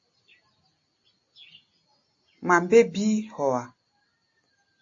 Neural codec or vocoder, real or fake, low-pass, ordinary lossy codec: none; real; 7.2 kHz; AAC, 48 kbps